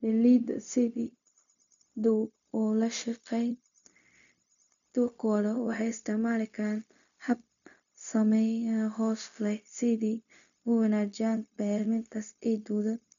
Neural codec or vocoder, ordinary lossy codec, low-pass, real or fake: codec, 16 kHz, 0.4 kbps, LongCat-Audio-Codec; none; 7.2 kHz; fake